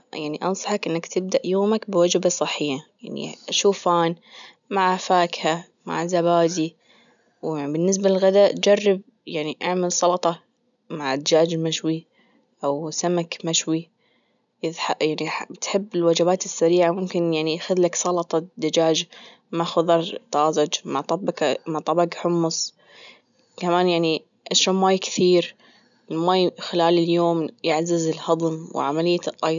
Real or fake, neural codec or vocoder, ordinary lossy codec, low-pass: real; none; none; 7.2 kHz